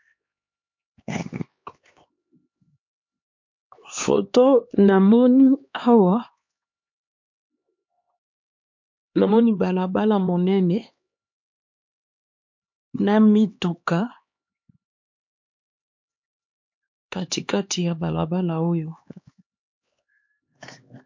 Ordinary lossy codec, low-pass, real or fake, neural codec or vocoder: MP3, 48 kbps; 7.2 kHz; fake; codec, 16 kHz, 2 kbps, X-Codec, HuBERT features, trained on LibriSpeech